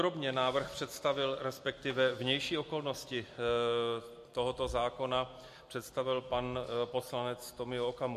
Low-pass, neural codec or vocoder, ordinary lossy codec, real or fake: 14.4 kHz; none; MP3, 64 kbps; real